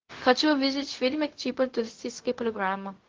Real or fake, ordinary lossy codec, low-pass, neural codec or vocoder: fake; Opus, 16 kbps; 7.2 kHz; codec, 16 kHz, 0.4 kbps, LongCat-Audio-Codec